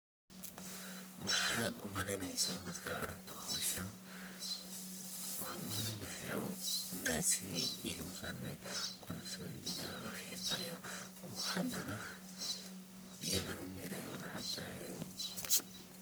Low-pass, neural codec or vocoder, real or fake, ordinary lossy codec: none; codec, 44.1 kHz, 1.7 kbps, Pupu-Codec; fake; none